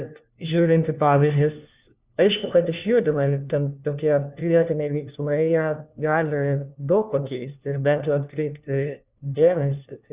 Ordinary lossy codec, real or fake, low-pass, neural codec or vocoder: Opus, 64 kbps; fake; 3.6 kHz; codec, 16 kHz, 1 kbps, FunCodec, trained on LibriTTS, 50 frames a second